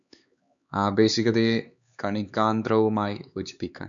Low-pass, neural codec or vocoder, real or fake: 7.2 kHz; codec, 16 kHz, 4 kbps, X-Codec, HuBERT features, trained on LibriSpeech; fake